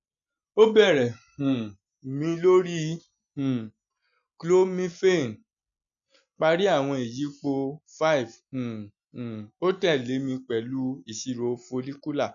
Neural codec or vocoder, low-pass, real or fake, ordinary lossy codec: none; 7.2 kHz; real; none